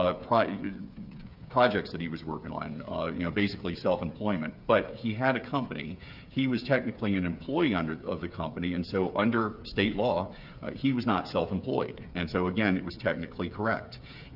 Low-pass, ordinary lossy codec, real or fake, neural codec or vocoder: 5.4 kHz; Opus, 64 kbps; fake; codec, 16 kHz, 8 kbps, FreqCodec, smaller model